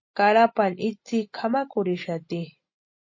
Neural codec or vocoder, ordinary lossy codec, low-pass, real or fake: none; MP3, 32 kbps; 7.2 kHz; real